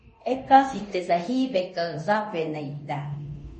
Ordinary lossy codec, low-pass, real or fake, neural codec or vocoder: MP3, 32 kbps; 10.8 kHz; fake; codec, 24 kHz, 0.9 kbps, DualCodec